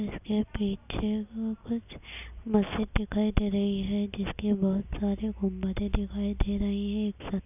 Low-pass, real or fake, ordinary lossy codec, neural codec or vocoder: 3.6 kHz; real; none; none